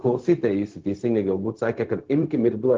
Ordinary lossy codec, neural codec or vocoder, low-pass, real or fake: Opus, 16 kbps; codec, 16 kHz, 0.4 kbps, LongCat-Audio-Codec; 7.2 kHz; fake